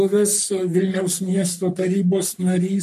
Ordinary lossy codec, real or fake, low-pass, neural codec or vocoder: MP3, 64 kbps; fake; 14.4 kHz; codec, 44.1 kHz, 3.4 kbps, Pupu-Codec